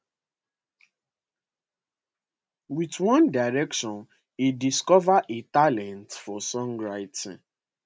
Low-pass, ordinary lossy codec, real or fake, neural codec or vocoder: none; none; real; none